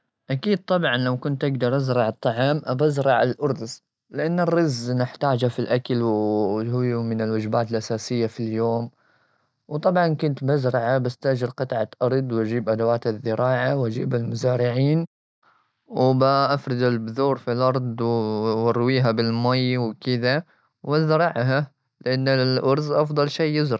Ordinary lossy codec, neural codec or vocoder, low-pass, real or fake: none; none; none; real